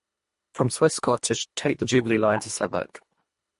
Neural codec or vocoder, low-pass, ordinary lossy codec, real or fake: codec, 24 kHz, 1.5 kbps, HILCodec; 10.8 kHz; MP3, 48 kbps; fake